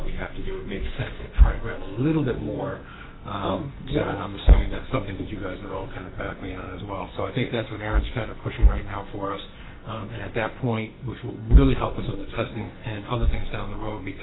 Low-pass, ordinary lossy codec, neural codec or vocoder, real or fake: 7.2 kHz; AAC, 16 kbps; codec, 44.1 kHz, 2.6 kbps, SNAC; fake